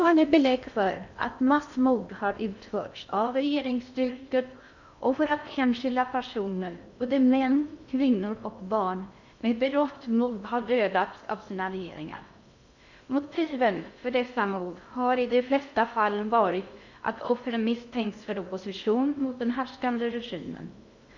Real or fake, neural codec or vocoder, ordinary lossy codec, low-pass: fake; codec, 16 kHz in and 24 kHz out, 0.6 kbps, FocalCodec, streaming, 2048 codes; none; 7.2 kHz